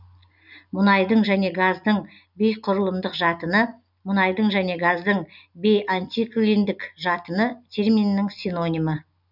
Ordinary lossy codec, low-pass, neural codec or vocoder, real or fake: none; 5.4 kHz; none; real